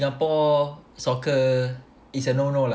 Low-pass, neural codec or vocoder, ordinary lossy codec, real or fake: none; none; none; real